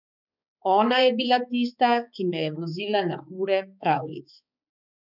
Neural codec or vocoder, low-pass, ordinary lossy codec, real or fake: codec, 16 kHz, 4 kbps, X-Codec, HuBERT features, trained on balanced general audio; 5.4 kHz; none; fake